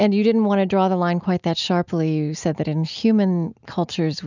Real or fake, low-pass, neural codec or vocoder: real; 7.2 kHz; none